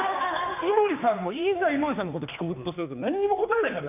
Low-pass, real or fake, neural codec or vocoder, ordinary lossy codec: 3.6 kHz; fake; codec, 16 kHz, 2 kbps, X-Codec, HuBERT features, trained on general audio; none